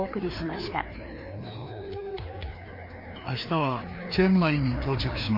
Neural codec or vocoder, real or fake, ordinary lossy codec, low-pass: codec, 16 kHz, 2 kbps, FreqCodec, larger model; fake; MP3, 32 kbps; 5.4 kHz